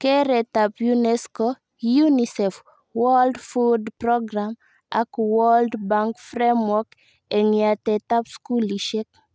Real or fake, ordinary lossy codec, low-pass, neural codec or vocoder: real; none; none; none